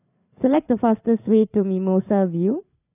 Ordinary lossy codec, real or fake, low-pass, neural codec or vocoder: none; fake; 3.6 kHz; codec, 16 kHz, 8 kbps, FreqCodec, larger model